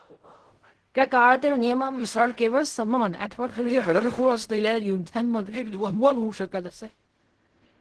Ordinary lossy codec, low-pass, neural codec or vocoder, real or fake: Opus, 16 kbps; 10.8 kHz; codec, 16 kHz in and 24 kHz out, 0.4 kbps, LongCat-Audio-Codec, fine tuned four codebook decoder; fake